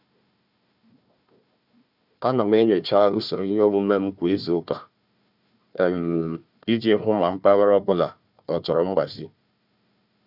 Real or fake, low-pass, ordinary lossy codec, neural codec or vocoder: fake; 5.4 kHz; none; codec, 16 kHz, 1 kbps, FunCodec, trained on Chinese and English, 50 frames a second